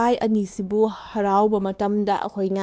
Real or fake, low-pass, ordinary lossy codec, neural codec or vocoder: fake; none; none; codec, 16 kHz, 2 kbps, X-Codec, WavLM features, trained on Multilingual LibriSpeech